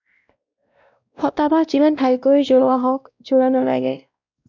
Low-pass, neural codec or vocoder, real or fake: 7.2 kHz; codec, 16 kHz, 1 kbps, X-Codec, WavLM features, trained on Multilingual LibriSpeech; fake